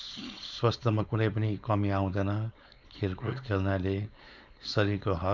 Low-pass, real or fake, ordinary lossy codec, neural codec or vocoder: 7.2 kHz; fake; none; codec, 16 kHz, 4.8 kbps, FACodec